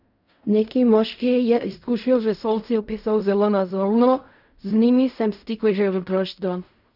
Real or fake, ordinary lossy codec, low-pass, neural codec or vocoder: fake; none; 5.4 kHz; codec, 16 kHz in and 24 kHz out, 0.4 kbps, LongCat-Audio-Codec, fine tuned four codebook decoder